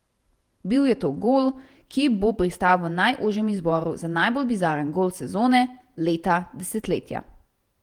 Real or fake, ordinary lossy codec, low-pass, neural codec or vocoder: fake; Opus, 24 kbps; 19.8 kHz; vocoder, 48 kHz, 128 mel bands, Vocos